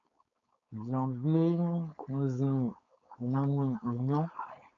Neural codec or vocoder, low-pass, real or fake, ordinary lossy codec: codec, 16 kHz, 4.8 kbps, FACodec; 7.2 kHz; fake; AAC, 64 kbps